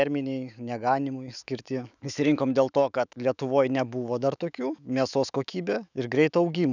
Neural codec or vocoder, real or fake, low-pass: autoencoder, 48 kHz, 128 numbers a frame, DAC-VAE, trained on Japanese speech; fake; 7.2 kHz